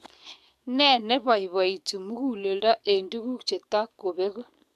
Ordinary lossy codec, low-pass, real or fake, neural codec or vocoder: MP3, 96 kbps; 14.4 kHz; fake; codec, 44.1 kHz, 7.8 kbps, DAC